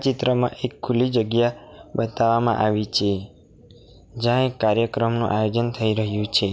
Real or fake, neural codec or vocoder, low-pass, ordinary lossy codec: real; none; none; none